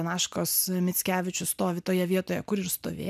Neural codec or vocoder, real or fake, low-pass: none; real; 14.4 kHz